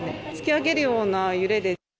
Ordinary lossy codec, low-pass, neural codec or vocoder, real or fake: none; none; none; real